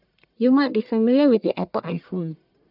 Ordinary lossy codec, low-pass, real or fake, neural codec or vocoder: none; 5.4 kHz; fake; codec, 44.1 kHz, 1.7 kbps, Pupu-Codec